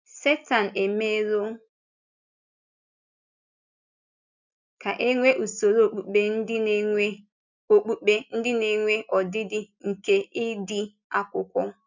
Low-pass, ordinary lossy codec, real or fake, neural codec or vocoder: 7.2 kHz; none; real; none